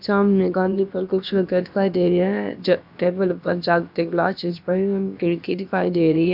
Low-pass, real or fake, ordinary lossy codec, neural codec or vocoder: 5.4 kHz; fake; none; codec, 16 kHz, about 1 kbps, DyCAST, with the encoder's durations